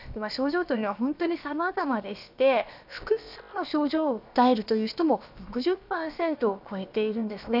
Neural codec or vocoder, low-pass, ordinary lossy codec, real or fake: codec, 16 kHz, about 1 kbps, DyCAST, with the encoder's durations; 5.4 kHz; none; fake